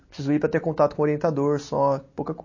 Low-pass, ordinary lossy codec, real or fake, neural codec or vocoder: 7.2 kHz; MP3, 32 kbps; real; none